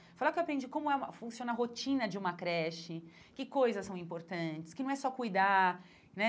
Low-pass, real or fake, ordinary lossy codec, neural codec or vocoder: none; real; none; none